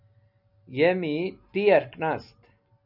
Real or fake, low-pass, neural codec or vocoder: real; 5.4 kHz; none